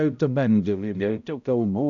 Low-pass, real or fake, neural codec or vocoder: 7.2 kHz; fake; codec, 16 kHz, 0.5 kbps, X-Codec, HuBERT features, trained on balanced general audio